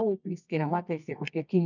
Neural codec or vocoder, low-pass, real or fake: codec, 24 kHz, 0.9 kbps, WavTokenizer, medium music audio release; 7.2 kHz; fake